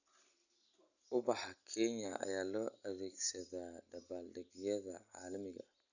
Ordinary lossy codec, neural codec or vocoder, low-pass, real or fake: none; none; 7.2 kHz; real